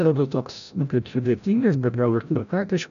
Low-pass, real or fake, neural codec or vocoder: 7.2 kHz; fake; codec, 16 kHz, 0.5 kbps, FreqCodec, larger model